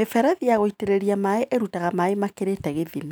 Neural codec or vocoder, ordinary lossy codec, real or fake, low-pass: none; none; real; none